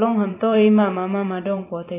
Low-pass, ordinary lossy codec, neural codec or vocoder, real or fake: 3.6 kHz; AAC, 24 kbps; vocoder, 44.1 kHz, 128 mel bands every 512 samples, BigVGAN v2; fake